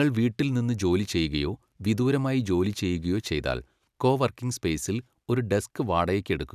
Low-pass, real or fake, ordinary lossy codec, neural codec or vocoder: 14.4 kHz; real; none; none